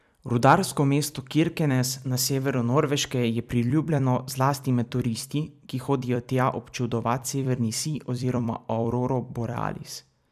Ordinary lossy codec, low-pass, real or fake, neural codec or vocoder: none; 14.4 kHz; fake; vocoder, 44.1 kHz, 128 mel bands every 256 samples, BigVGAN v2